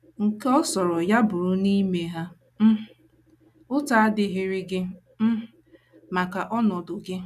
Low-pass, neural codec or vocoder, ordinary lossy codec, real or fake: 14.4 kHz; none; none; real